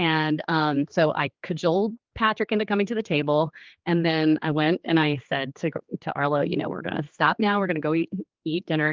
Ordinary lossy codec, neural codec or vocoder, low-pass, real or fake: Opus, 32 kbps; codec, 16 kHz, 4 kbps, X-Codec, HuBERT features, trained on general audio; 7.2 kHz; fake